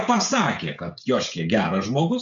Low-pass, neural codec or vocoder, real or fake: 7.2 kHz; codec, 16 kHz, 16 kbps, FreqCodec, smaller model; fake